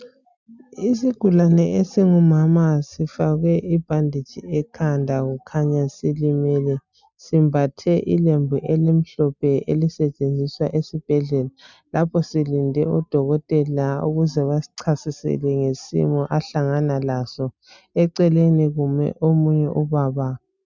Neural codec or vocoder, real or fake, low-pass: none; real; 7.2 kHz